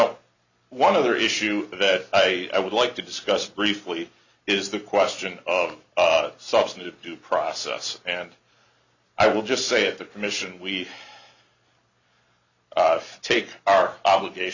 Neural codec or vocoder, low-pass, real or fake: none; 7.2 kHz; real